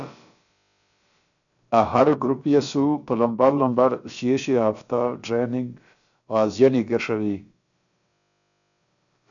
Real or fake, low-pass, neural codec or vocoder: fake; 7.2 kHz; codec, 16 kHz, about 1 kbps, DyCAST, with the encoder's durations